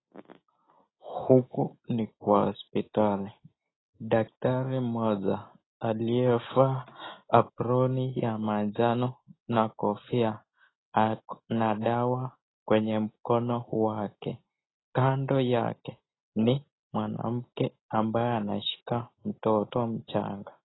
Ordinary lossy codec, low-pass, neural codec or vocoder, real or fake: AAC, 16 kbps; 7.2 kHz; none; real